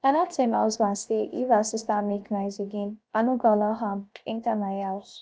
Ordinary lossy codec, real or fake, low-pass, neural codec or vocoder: none; fake; none; codec, 16 kHz, 0.7 kbps, FocalCodec